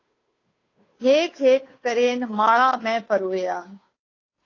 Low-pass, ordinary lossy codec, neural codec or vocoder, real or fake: 7.2 kHz; AAC, 32 kbps; codec, 16 kHz, 2 kbps, FunCodec, trained on Chinese and English, 25 frames a second; fake